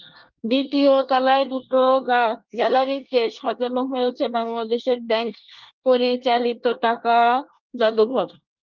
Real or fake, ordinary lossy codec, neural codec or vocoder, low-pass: fake; Opus, 32 kbps; codec, 24 kHz, 1 kbps, SNAC; 7.2 kHz